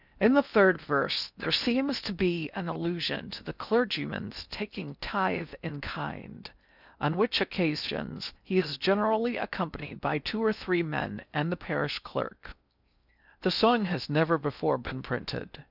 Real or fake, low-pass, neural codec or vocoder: fake; 5.4 kHz; codec, 16 kHz in and 24 kHz out, 0.6 kbps, FocalCodec, streaming, 4096 codes